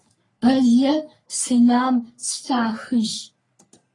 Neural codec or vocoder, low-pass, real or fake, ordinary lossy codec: codec, 44.1 kHz, 3.4 kbps, Pupu-Codec; 10.8 kHz; fake; AAC, 32 kbps